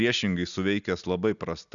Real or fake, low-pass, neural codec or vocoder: real; 7.2 kHz; none